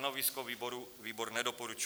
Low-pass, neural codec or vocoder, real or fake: 19.8 kHz; none; real